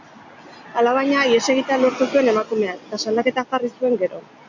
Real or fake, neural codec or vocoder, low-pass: real; none; 7.2 kHz